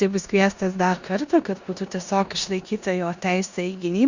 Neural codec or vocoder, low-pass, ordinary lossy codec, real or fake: codec, 16 kHz in and 24 kHz out, 0.9 kbps, LongCat-Audio-Codec, four codebook decoder; 7.2 kHz; Opus, 64 kbps; fake